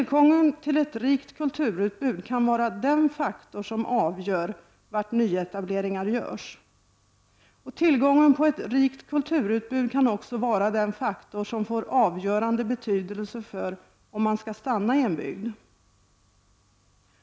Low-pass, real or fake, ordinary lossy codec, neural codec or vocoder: none; real; none; none